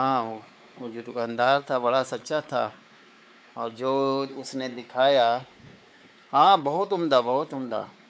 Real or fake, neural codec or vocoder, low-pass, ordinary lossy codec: fake; codec, 16 kHz, 4 kbps, X-Codec, WavLM features, trained on Multilingual LibriSpeech; none; none